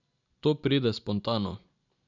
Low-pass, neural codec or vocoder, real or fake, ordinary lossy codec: 7.2 kHz; none; real; none